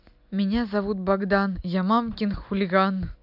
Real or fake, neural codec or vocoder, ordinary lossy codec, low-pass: real; none; AAC, 48 kbps; 5.4 kHz